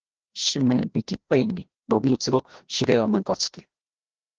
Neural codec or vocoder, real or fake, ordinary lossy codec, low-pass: codec, 16 kHz, 1 kbps, FreqCodec, larger model; fake; Opus, 16 kbps; 7.2 kHz